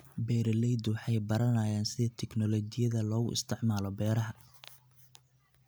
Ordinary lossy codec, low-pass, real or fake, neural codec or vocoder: none; none; real; none